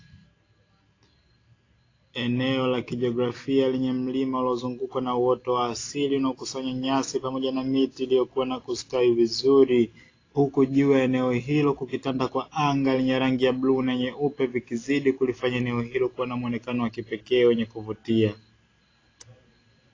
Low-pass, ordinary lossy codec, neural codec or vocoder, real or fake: 7.2 kHz; AAC, 32 kbps; none; real